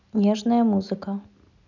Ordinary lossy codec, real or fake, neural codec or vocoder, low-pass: none; real; none; 7.2 kHz